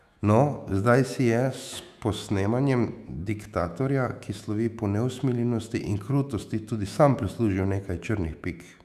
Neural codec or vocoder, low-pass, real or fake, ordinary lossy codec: autoencoder, 48 kHz, 128 numbers a frame, DAC-VAE, trained on Japanese speech; 14.4 kHz; fake; none